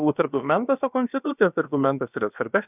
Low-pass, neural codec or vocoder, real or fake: 3.6 kHz; codec, 16 kHz, about 1 kbps, DyCAST, with the encoder's durations; fake